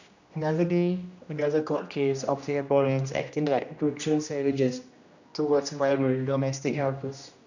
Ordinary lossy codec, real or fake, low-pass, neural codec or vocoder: none; fake; 7.2 kHz; codec, 16 kHz, 1 kbps, X-Codec, HuBERT features, trained on general audio